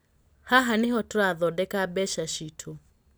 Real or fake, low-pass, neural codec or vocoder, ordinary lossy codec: real; none; none; none